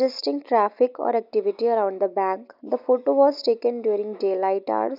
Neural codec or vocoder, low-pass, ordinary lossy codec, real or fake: none; 5.4 kHz; none; real